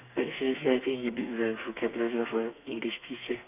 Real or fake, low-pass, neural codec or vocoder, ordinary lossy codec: fake; 3.6 kHz; codec, 32 kHz, 1.9 kbps, SNAC; none